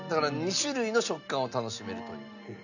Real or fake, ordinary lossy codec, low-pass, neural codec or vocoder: real; none; 7.2 kHz; none